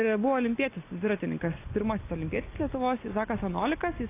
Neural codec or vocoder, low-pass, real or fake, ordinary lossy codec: none; 3.6 kHz; real; AAC, 24 kbps